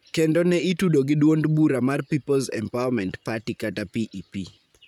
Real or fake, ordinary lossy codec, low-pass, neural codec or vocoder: fake; none; 19.8 kHz; vocoder, 44.1 kHz, 128 mel bands, Pupu-Vocoder